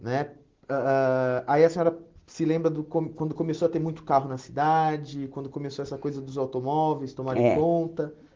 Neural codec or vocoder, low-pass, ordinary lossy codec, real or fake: none; 7.2 kHz; Opus, 16 kbps; real